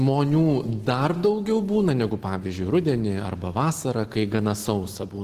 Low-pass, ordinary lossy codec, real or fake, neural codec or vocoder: 14.4 kHz; Opus, 16 kbps; real; none